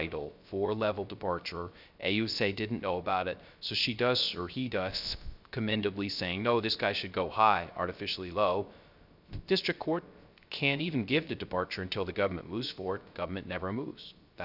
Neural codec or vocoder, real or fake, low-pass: codec, 16 kHz, 0.3 kbps, FocalCodec; fake; 5.4 kHz